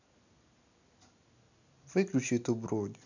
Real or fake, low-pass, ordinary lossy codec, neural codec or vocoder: real; 7.2 kHz; none; none